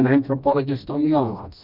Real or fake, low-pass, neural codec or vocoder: fake; 5.4 kHz; codec, 16 kHz, 1 kbps, FreqCodec, smaller model